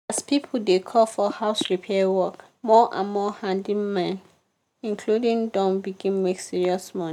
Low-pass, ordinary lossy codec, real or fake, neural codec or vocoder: 19.8 kHz; none; real; none